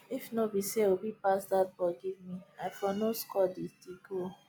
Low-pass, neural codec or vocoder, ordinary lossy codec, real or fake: none; none; none; real